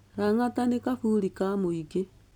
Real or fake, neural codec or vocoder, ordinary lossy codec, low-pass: real; none; none; 19.8 kHz